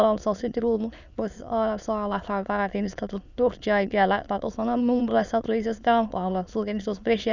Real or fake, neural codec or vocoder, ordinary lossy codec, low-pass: fake; autoencoder, 22.05 kHz, a latent of 192 numbers a frame, VITS, trained on many speakers; none; 7.2 kHz